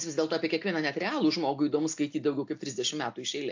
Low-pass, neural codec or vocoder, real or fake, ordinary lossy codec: 7.2 kHz; none; real; AAC, 48 kbps